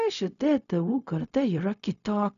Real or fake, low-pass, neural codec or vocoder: fake; 7.2 kHz; codec, 16 kHz, 0.4 kbps, LongCat-Audio-Codec